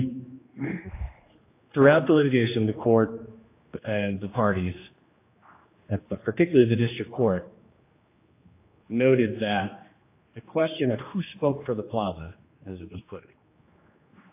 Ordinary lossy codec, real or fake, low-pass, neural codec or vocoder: AAC, 24 kbps; fake; 3.6 kHz; codec, 16 kHz, 2 kbps, X-Codec, HuBERT features, trained on general audio